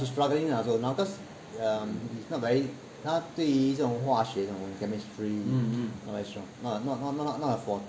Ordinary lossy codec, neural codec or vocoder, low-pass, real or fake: none; none; none; real